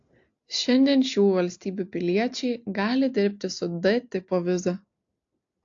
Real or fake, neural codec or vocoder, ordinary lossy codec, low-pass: real; none; AAC, 48 kbps; 7.2 kHz